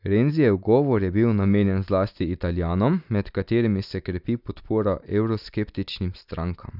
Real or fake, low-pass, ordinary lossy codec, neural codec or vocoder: real; 5.4 kHz; none; none